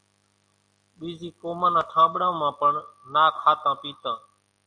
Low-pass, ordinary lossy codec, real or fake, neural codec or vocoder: 9.9 kHz; AAC, 64 kbps; real; none